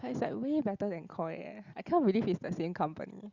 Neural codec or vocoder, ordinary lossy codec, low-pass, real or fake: none; Opus, 64 kbps; 7.2 kHz; real